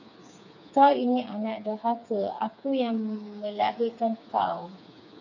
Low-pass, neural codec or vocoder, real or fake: 7.2 kHz; codec, 16 kHz, 4 kbps, FreqCodec, smaller model; fake